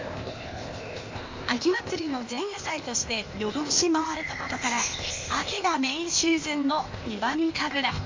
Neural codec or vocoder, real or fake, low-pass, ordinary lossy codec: codec, 16 kHz, 0.8 kbps, ZipCodec; fake; 7.2 kHz; MP3, 48 kbps